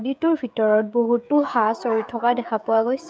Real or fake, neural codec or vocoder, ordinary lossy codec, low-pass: fake; codec, 16 kHz, 16 kbps, FreqCodec, smaller model; none; none